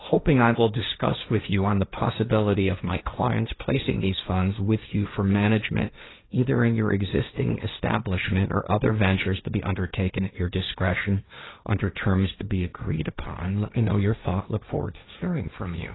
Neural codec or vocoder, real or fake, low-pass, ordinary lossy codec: codec, 16 kHz, 1.1 kbps, Voila-Tokenizer; fake; 7.2 kHz; AAC, 16 kbps